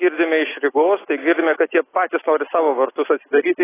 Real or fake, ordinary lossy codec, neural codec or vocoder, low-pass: real; AAC, 16 kbps; none; 3.6 kHz